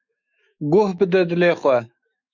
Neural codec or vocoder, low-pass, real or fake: autoencoder, 48 kHz, 128 numbers a frame, DAC-VAE, trained on Japanese speech; 7.2 kHz; fake